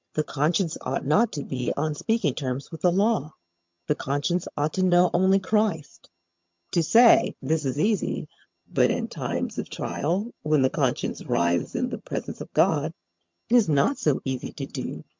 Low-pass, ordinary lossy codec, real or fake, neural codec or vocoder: 7.2 kHz; MP3, 64 kbps; fake; vocoder, 22.05 kHz, 80 mel bands, HiFi-GAN